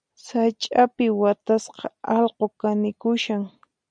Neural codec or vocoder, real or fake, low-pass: none; real; 9.9 kHz